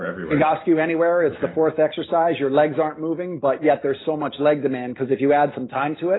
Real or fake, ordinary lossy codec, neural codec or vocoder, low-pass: real; AAC, 16 kbps; none; 7.2 kHz